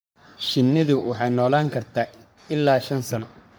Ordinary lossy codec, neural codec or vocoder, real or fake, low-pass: none; codec, 44.1 kHz, 3.4 kbps, Pupu-Codec; fake; none